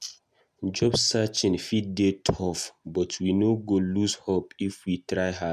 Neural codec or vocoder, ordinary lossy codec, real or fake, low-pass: none; none; real; 14.4 kHz